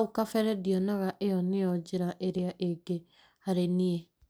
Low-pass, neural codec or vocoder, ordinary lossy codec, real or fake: none; none; none; real